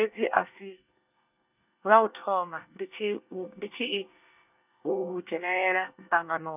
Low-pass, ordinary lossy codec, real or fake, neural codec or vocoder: 3.6 kHz; none; fake; codec, 24 kHz, 1 kbps, SNAC